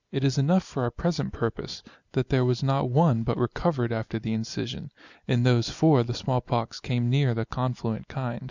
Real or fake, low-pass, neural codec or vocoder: real; 7.2 kHz; none